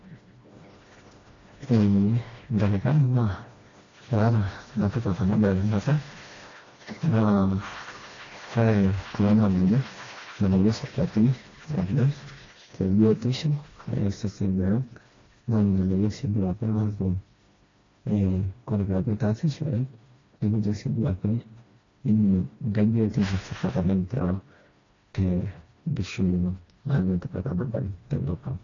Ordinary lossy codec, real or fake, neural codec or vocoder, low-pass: AAC, 32 kbps; fake; codec, 16 kHz, 1 kbps, FreqCodec, smaller model; 7.2 kHz